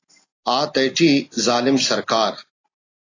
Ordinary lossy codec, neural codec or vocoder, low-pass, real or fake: AAC, 32 kbps; none; 7.2 kHz; real